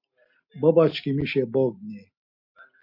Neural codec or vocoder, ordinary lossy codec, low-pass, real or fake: none; MP3, 32 kbps; 5.4 kHz; real